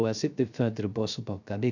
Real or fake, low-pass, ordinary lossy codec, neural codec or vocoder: fake; 7.2 kHz; none; codec, 16 kHz, 0.3 kbps, FocalCodec